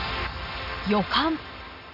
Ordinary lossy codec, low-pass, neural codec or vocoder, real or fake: none; 5.4 kHz; none; real